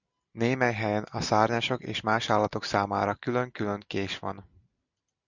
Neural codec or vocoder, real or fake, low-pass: none; real; 7.2 kHz